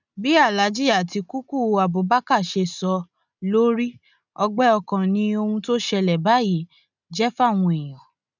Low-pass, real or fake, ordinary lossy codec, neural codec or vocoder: 7.2 kHz; real; none; none